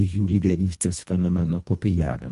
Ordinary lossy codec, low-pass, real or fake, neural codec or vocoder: MP3, 64 kbps; 10.8 kHz; fake; codec, 24 kHz, 1.5 kbps, HILCodec